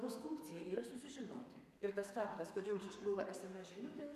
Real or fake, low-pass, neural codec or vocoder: fake; 14.4 kHz; codec, 32 kHz, 1.9 kbps, SNAC